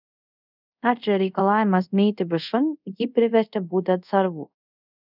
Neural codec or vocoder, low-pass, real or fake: codec, 24 kHz, 0.5 kbps, DualCodec; 5.4 kHz; fake